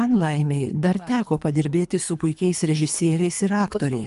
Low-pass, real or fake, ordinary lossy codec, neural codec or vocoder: 10.8 kHz; fake; MP3, 96 kbps; codec, 24 kHz, 3 kbps, HILCodec